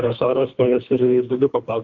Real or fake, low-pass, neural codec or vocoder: fake; 7.2 kHz; codec, 24 kHz, 3 kbps, HILCodec